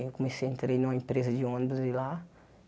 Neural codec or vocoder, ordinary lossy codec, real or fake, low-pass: none; none; real; none